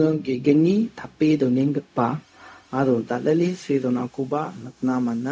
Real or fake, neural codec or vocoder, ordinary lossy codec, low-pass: fake; codec, 16 kHz, 0.4 kbps, LongCat-Audio-Codec; none; none